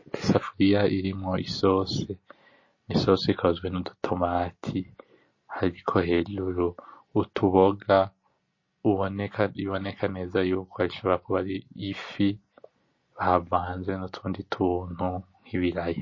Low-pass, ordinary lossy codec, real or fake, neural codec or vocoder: 7.2 kHz; MP3, 32 kbps; real; none